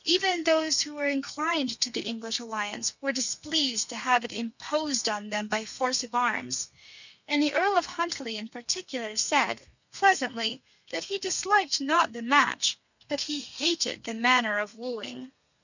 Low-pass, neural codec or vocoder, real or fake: 7.2 kHz; codec, 32 kHz, 1.9 kbps, SNAC; fake